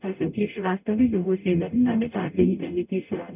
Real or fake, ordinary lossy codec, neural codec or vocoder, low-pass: fake; none; codec, 44.1 kHz, 0.9 kbps, DAC; 3.6 kHz